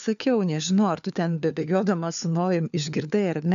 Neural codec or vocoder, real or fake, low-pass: codec, 16 kHz, 4 kbps, FunCodec, trained on LibriTTS, 50 frames a second; fake; 7.2 kHz